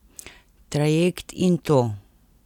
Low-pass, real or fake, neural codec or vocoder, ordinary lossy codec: 19.8 kHz; real; none; none